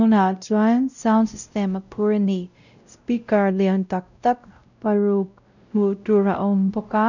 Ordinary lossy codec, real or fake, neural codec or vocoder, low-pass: none; fake; codec, 16 kHz, 0.5 kbps, X-Codec, WavLM features, trained on Multilingual LibriSpeech; 7.2 kHz